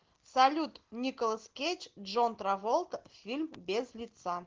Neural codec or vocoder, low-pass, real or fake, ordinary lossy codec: none; 7.2 kHz; real; Opus, 16 kbps